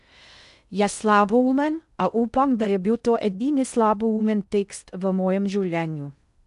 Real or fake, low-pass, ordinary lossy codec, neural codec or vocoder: fake; 10.8 kHz; none; codec, 16 kHz in and 24 kHz out, 0.6 kbps, FocalCodec, streaming, 2048 codes